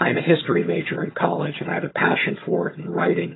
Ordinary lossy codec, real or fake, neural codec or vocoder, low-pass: AAC, 16 kbps; fake; vocoder, 22.05 kHz, 80 mel bands, HiFi-GAN; 7.2 kHz